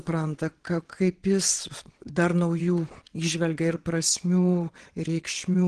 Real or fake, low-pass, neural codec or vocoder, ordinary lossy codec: fake; 9.9 kHz; vocoder, 22.05 kHz, 80 mel bands, WaveNeXt; Opus, 16 kbps